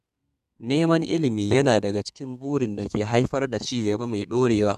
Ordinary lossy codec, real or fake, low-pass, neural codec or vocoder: none; fake; 14.4 kHz; codec, 32 kHz, 1.9 kbps, SNAC